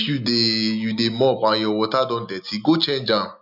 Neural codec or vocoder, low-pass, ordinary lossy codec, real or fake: none; 5.4 kHz; none; real